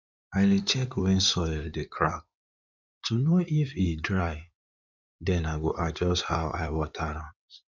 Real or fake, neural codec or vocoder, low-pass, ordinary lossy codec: fake; codec, 16 kHz in and 24 kHz out, 2.2 kbps, FireRedTTS-2 codec; 7.2 kHz; none